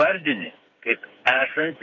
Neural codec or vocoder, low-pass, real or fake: codec, 16 kHz, 4 kbps, FreqCodec, smaller model; 7.2 kHz; fake